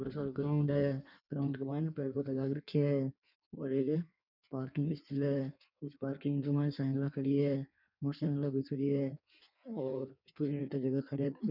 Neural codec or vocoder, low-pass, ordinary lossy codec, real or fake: codec, 16 kHz in and 24 kHz out, 1.1 kbps, FireRedTTS-2 codec; 5.4 kHz; none; fake